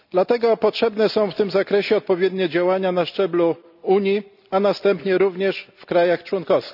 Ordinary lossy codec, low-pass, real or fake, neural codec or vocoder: none; 5.4 kHz; real; none